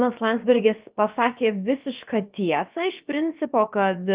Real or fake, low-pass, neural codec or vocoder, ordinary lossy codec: fake; 3.6 kHz; codec, 16 kHz, about 1 kbps, DyCAST, with the encoder's durations; Opus, 32 kbps